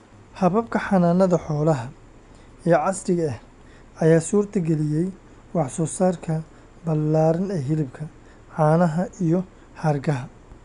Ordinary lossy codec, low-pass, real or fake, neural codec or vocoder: none; 10.8 kHz; real; none